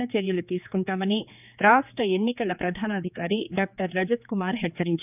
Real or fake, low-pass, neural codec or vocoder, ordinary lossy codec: fake; 3.6 kHz; codec, 16 kHz, 4 kbps, X-Codec, HuBERT features, trained on general audio; none